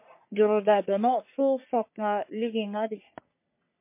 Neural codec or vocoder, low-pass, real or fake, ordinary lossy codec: codec, 44.1 kHz, 3.4 kbps, Pupu-Codec; 3.6 kHz; fake; MP3, 24 kbps